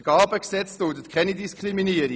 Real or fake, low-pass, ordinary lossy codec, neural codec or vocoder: real; none; none; none